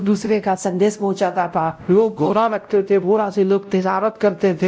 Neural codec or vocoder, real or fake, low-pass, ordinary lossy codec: codec, 16 kHz, 0.5 kbps, X-Codec, WavLM features, trained on Multilingual LibriSpeech; fake; none; none